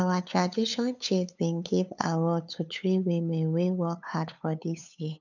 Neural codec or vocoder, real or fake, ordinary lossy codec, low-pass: codec, 16 kHz, 8 kbps, FunCodec, trained on LibriTTS, 25 frames a second; fake; none; 7.2 kHz